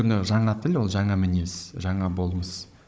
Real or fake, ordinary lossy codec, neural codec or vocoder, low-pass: fake; none; codec, 16 kHz, 16 kbps, FunCodec, trained on Chinese and English, 50 frames a second; none